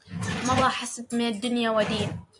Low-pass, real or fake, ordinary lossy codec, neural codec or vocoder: 10.8 kHz; fake; AAC, 64 kbps; vocoder, 44.1 kHz, 128 mel bands every 256 samples, BigVGAN v2